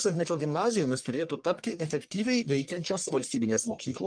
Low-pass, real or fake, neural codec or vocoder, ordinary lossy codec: 9.9 kHz; fake; codec, 44.1 kHz, 1.7 kbps, Pupu-Codec; Opus, 32 kbps